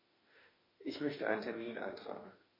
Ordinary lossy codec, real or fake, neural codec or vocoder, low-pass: MP3, 24 kbps; fake; autoencoder, 48 kHz, 32 numbers a frame, DAC-VAE, trained on Japanese speech; 5.4 kHz